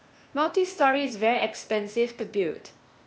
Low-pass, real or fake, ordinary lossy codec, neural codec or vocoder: none; fake; none; codec, 16 kHz, 0.8 kbps, ZipCodec